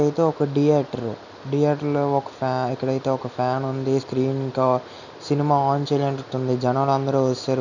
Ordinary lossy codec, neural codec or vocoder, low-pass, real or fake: none; none; 7.2 kHz; real